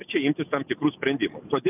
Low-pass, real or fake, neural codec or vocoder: 3.6 kHz; real; none